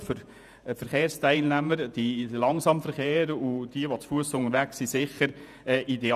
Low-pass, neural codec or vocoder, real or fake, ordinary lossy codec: 14.4 kHz; vocoder, 48 kHz, 128 mel bands, Vocos; fake; none